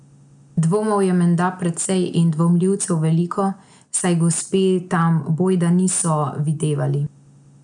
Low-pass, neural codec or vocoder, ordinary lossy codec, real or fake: 9.9 kHz; none; none; real